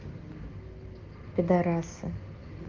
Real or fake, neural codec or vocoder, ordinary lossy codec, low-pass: real; none; Opus, 32 kbps; 7.2 kHz